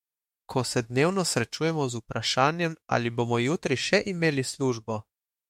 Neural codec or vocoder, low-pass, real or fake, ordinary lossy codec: autoencoder, 48 kHz, 32 numbers a frame, DAC-VAE, trained on Japanese speech; 19.8 kHz; fake; MP3, 64 kbps